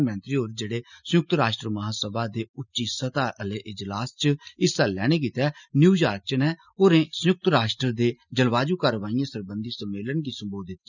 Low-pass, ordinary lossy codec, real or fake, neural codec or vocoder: 7.2 kHz; none; real; none